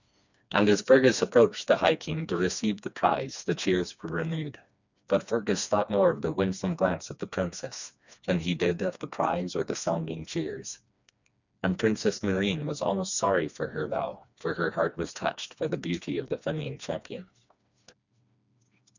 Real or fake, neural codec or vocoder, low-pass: fake; codec, 16 kHz, 2 kbps, FreqCodec, smaller model; 7.2 kHz